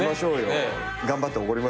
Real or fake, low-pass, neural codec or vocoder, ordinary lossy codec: real; none; none; none